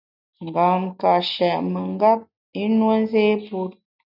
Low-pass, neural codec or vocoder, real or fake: 5.4 kHz; none; real